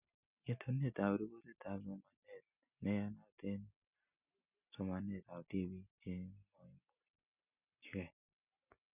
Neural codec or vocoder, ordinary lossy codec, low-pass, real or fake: none; none; 3.6 kHz; real